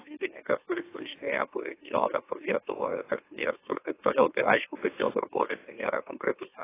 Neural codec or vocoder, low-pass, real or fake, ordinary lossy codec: autoencoder, 44.1 kHz, a latent of 192 numbers a frame, MeloTTS; 3.6 kHz; fake; AAC, 24 kbps